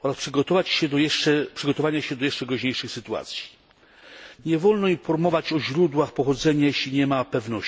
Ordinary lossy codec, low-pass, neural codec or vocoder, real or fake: none; none; none; real